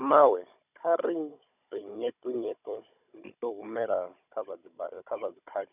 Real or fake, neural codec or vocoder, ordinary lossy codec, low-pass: fake; codec, 16 kHz, 16 kbps, FunCodec, trained on Chinese and English, 50 frames a second; none; 3.6 kHz